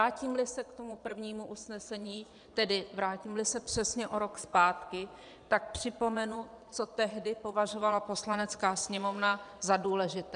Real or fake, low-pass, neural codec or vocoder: fake; 9.9 kHz; vocoder, 22.05 kHz, 80 mel bands, Vocos